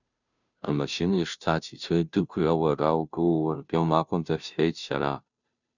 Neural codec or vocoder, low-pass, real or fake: codec, 16 kHz, 0.5 kbps, FunCodec, trained on Chinese and English, 25 frames a second; 7.2 kHz; fake